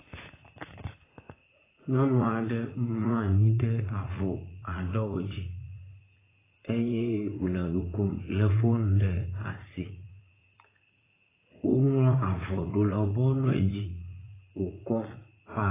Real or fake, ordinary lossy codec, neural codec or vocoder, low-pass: fake; AAC, 16 kbps; vocoder, 44.1 kHz, 80 mel bands, Vocos; 3.6 kHz